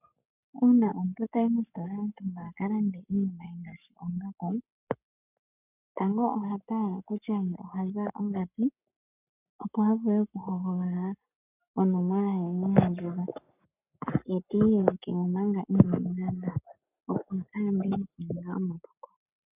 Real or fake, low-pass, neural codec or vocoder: fake; 3.6 kHz; codec, 44.1 kHz, 7.8 kbps, Pupu-Codec